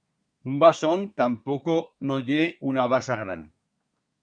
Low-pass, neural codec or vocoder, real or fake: 9.9 kHz; codec, 24 kHz, 1 kbps, SNAC; fake